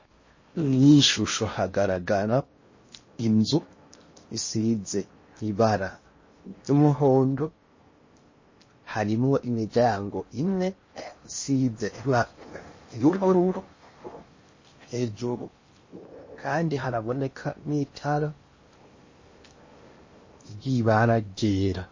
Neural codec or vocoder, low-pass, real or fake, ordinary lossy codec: codec, 16 kHz in and 24 kHz out, 0.6 kbps, FocalCodec, streaming, 4096 codes; 7.2 kHz; fake; MP3, 32 kbps